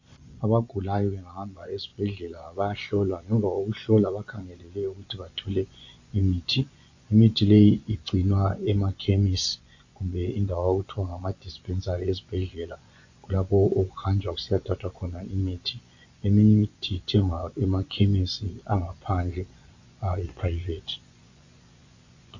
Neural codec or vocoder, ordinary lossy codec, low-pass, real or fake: vocoder, 24 kHz, 100 mel bands, Vocos; AAC, 48 kbps; 7.2 kHz; fake